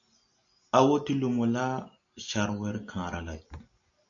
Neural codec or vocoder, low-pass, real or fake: none; 7.2 kHz; real